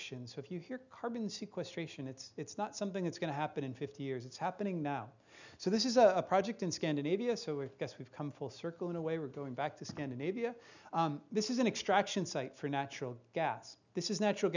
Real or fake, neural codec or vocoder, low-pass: real; none; 7.2 kHz